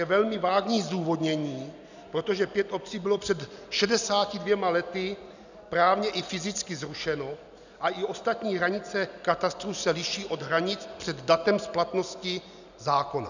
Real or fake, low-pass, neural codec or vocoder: real; 7.2 kHz; none